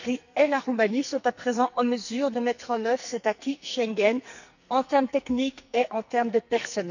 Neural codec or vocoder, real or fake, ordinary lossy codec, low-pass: codec, 44.1 kHz, 2.6 kbps, SNAC; fake; none; 7.2 kHz